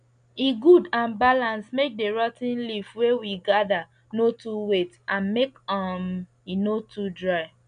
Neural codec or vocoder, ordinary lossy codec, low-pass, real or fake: none; none; 9.9 kHz; real